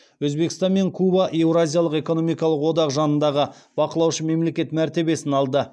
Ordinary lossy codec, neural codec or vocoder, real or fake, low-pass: none; none; real; none